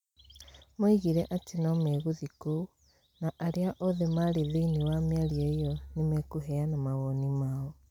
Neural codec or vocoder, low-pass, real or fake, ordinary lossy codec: none; 19.8 kHz; real; none